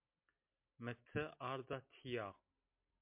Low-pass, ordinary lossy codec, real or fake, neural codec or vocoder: 3.6 kHz; MP3, 32 kbps; real; none